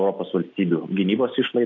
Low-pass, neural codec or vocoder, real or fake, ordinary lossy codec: 7.2 kHz; none; real; AAC, 48 kbps